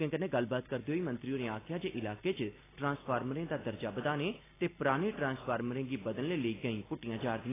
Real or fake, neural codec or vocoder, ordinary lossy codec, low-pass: real; none; AAC, 16 kbps; 3.6 kHz